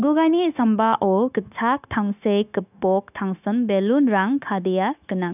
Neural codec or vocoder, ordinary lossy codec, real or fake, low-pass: codec, 16 kHz, 0.9 kbps, LongCat-Audio-Codec; none; fake; 3.6 kHz